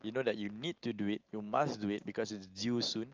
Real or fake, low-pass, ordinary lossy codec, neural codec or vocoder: real; 7.2 kHz; Opus, 32 kbps; none